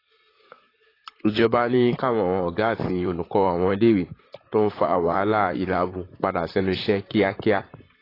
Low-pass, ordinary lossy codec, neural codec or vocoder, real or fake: 5.4 kHz; AAC, 24 kbps; vocoder, 44.1 kHz, 128 mel bands, Pupu-Vocoder; fake